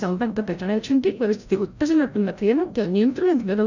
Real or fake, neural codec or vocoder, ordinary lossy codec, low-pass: fake; codec, 16 kHz, 0.5 kbps, FreqCodec, larger model; none; 7.2 kHz